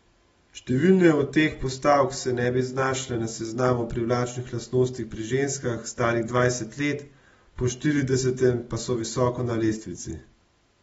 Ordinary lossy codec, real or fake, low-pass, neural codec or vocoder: AAC, 24 kbps; real; 19.8 kHz; none